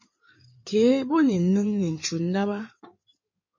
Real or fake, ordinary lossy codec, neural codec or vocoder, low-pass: fake; MP3, 32 kbps; codec, 16 kHz in and 24 kHz out, 2.2 kbps, FireRedTTS-2 codec; 7.2 kHz